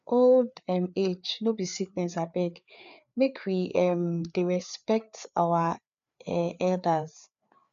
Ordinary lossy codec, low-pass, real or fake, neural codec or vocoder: none; 7.2 kHz; fake; codec, 16 kHz, 4 kbps, FreqCodec, larger model